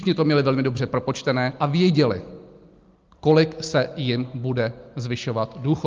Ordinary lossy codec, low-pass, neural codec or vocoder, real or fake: Opus, 32 kbps; 7.2 kHz; none; real